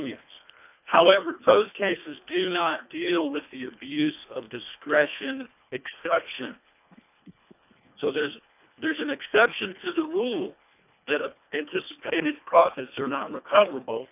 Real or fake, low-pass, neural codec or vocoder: fake; 3.6 kHz; codec, 24 kHz, 1.5 kbps, HILCodec